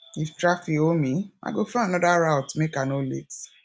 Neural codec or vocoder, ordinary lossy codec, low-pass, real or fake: none; none; none; real